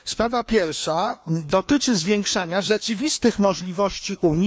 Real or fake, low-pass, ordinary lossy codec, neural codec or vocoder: fake; none; none; codec, 16 kHz, 2 kbps, FreqCodec, larger model